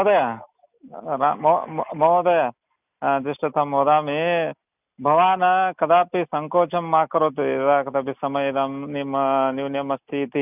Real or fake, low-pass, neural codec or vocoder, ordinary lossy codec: real; 3.6 kHz; none; none